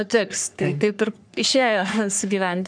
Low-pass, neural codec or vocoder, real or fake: 9.9 kHz; codec, 44.1 kHz, 3.4 kbps, Pupu-Codec; fake